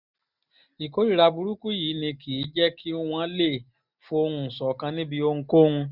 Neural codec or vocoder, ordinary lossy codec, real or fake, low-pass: none; none; real; 5.4 kHz